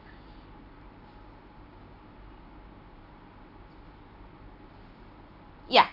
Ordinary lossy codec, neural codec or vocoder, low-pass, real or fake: none; none; 5.4 kHz; real